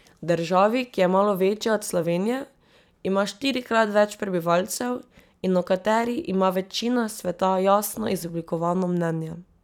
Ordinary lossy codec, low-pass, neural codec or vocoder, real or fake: none; 19.8 kHz; vocoder, 44.1 kHz, 128 mel bands every 256 samples, BigVGAN v2; fake